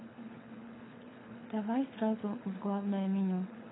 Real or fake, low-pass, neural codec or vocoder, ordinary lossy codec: fake; 7.2 kHz; codec, 24 kHz, 6 kbps, HILCodec; AAC, 16 kbps